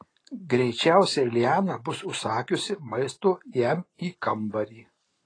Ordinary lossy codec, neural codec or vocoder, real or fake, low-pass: AAC, 32 kbps; none; real; 9.9 kHz